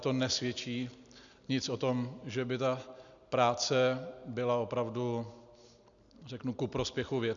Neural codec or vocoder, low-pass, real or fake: none; 7.2 kHz; real